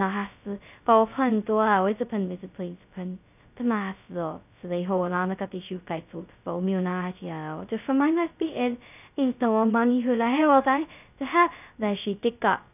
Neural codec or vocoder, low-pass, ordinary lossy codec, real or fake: codec, 16 kHz, 0.2 kbps, FocalCodec; 3.6 kHz; none; fake